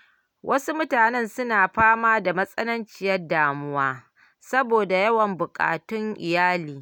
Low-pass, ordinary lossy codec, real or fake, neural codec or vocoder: 19.8 kHz; none; real; none